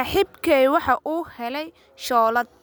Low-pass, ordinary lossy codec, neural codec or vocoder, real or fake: none; none; none; real